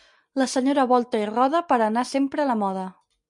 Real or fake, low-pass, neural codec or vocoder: real; 10.8 kHz; none